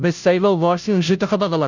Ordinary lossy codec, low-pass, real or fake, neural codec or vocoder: none; 7.2 kHz; fake; codec, 16 kHz, 0.5 kbps, FunCodec, trained on Chinese and English, 25 frames a second